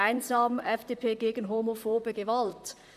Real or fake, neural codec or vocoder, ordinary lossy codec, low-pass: fake; vocoder, 44.1 kHz, 128 mel bands, Pupu-Vocoder; none; 14.4 kHz